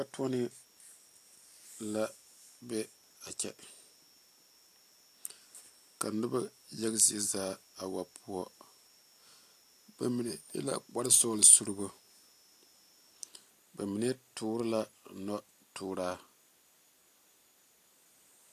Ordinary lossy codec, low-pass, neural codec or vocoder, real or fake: AAC, 64 kbps; 14.4 kHz; none; real